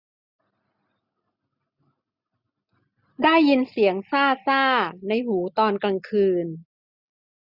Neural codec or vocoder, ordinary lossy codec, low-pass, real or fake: none; none; 5.4 kHz; real